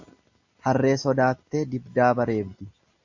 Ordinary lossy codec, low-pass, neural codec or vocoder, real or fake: AAC, 48 kbps; 7.2 kHz; none; real